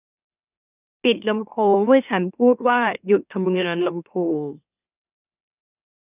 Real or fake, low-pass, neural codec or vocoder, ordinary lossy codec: fake; 3.6 kHz; autoencoder, 44.1 kHz, a latent of 192 numbers a frame, MeloTTS; none